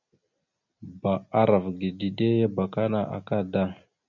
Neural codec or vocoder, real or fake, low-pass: none; real; 7.2 kHz